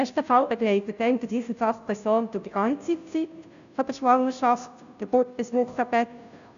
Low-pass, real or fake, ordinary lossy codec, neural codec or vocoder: 7.2 kHz; fake; none; codec, 16 kHz, 0.5 kbps, FunCodec, trained on Chinese and English, 25 frames a second